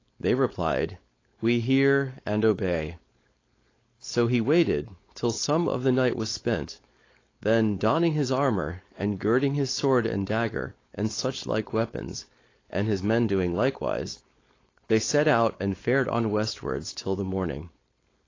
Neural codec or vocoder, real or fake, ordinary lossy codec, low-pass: codec, 16 kHz, 4.8 kbps, FACodec; fake; AAC, 32 kbps; 7.2 kHz